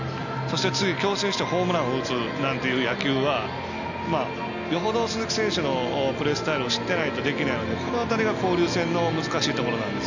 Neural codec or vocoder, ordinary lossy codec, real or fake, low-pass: none; none; real; 7.2 kHz